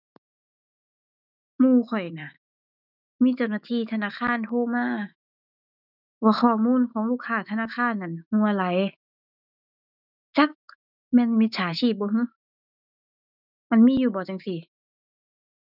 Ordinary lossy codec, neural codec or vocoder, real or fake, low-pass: none; autoencoder, 48 kHz, 128 numbers a frame, DAC-VAE, trained on Japanese speech; fake; 5.4 kHz